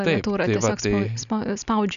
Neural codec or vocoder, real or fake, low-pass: none; real; 7.2 kHz